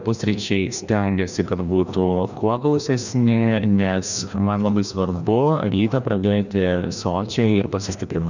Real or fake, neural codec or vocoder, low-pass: fake; codec, 16 kHz, 1 kbps, FreqCodec, larger model; 7.2 kHz